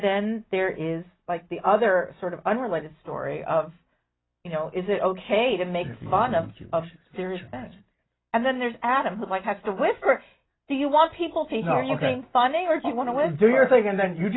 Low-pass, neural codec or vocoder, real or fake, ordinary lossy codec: 7.2 kHz; none; real; AAC, 16 kbps